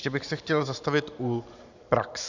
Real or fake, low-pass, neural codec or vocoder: fake; 7.2 kHz; vocoder, 44.1 kHz, 128 mel bands every 512 samples, BigVGAN v2